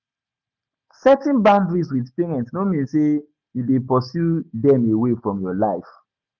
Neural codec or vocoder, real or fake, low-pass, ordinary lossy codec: none; real; 7.2 kHz; none